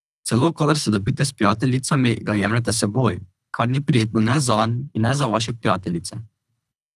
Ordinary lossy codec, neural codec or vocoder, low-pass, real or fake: none; codec, 24 kHz, 3 kbps, HILCodec; none; fake